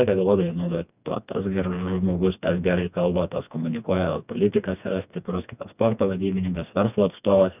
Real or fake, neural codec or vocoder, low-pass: fake; codec, 16 kHz, 2 kbps, FreqCodec, smaller model; 3.6 kHz